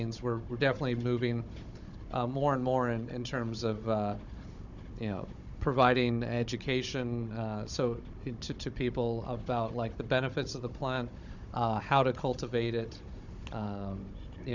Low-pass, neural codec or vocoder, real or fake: 7.2 kHz; codec, 16 kHz, 16 kbps, FunCodec, trained on Chinese and English, 50 frames a second; fake